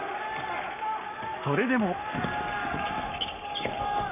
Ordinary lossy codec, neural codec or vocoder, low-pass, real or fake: none; codec, 44.1 kHz, 7.8 kbps, Pupu-Codec; 3.6 kHz; fake